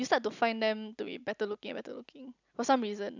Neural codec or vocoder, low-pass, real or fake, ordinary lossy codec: none; 7.2 kHz; real; none